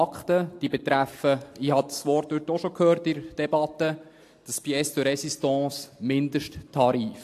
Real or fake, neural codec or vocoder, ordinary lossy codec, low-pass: real; none; AAC, 64 kbps; 14.4 kHz